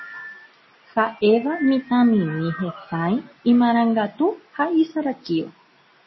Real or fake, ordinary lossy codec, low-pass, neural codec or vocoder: real; MP3, 24 kbps; 7.2 kHz; none